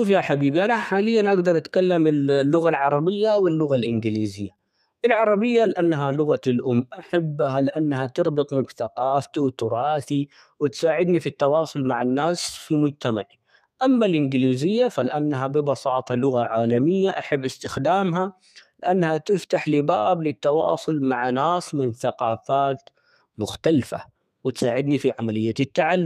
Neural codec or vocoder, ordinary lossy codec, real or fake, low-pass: codec, 32 kHz, 1.9 kbps, SNAC; none; fake; 14.4 kHz